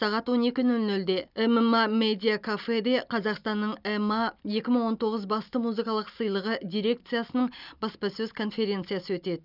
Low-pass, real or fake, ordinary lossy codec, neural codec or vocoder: 5.4 kHz; real; none; none